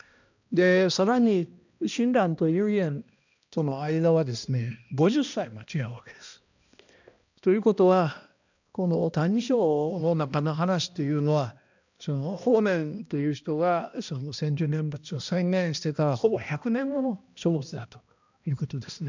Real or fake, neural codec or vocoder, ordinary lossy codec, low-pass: fake; codec, 16 kHz, 1 kbps, X-Codec, HuBERT features, trained on balanced general audio; none; 7.2 kHz